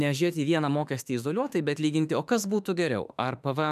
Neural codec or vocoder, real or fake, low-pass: autoencoder, 48 kHz, 32 numbers a frame, DAC-VAE, trained on Japanese speech; fake; 14.4 kHz